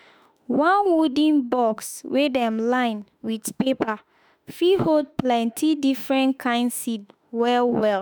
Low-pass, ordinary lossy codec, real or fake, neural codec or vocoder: none; none; fake; autoencoder, 48 kHz, 32 numbers a frame, DAC-VAE, trained on Japanese speech